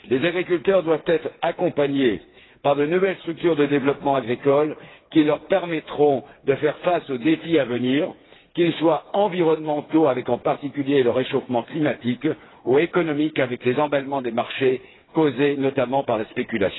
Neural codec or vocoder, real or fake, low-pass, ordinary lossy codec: codec, 16 kHz, 4 kbps, FreqCodec, smaller model; fake; 7.2 kHz; AAC, 16 kbps